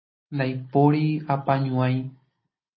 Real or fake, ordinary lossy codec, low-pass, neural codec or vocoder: real; MP3, 24 kbps; 7.2 kHz; none